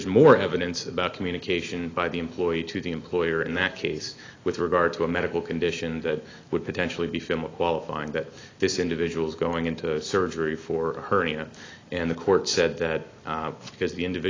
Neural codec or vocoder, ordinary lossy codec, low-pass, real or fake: none; AAC, 32 kbps; 7.2 kHz; real